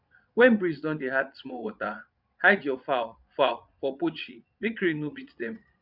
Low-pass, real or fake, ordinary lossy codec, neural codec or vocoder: 5.4 kHz; fake; none; vocoder, 22.05 kHz, 80 mel bands, Vocos